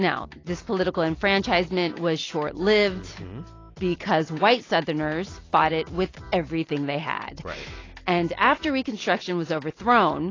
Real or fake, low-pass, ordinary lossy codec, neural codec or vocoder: real; 7.2 kHz; AAC, 32 kbps; none